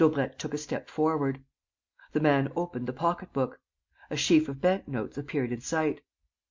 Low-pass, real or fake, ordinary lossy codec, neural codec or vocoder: 7.2 kHz; real; MP3, 64 kbps; none